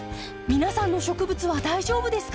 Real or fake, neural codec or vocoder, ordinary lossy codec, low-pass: real; none; none; none